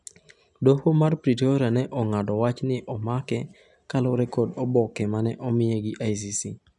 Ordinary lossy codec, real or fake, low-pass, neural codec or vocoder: none; real; 10.8 kHz; none